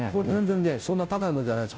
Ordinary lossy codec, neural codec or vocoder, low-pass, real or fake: none; codec, 16 kHz, 0.5 kbps, FunCodec, trained on Chinese and English, 25 frames a second; none; fake